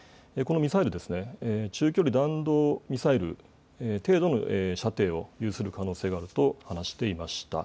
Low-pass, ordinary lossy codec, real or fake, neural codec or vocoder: none; none; real; none